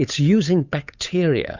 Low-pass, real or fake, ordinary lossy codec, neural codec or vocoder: 7.2 kHz; fake; Opus, 64 kbps; codec, 16 kHz, 8 kbps, FunCodec, trained on Chinese and English, 25 frames a second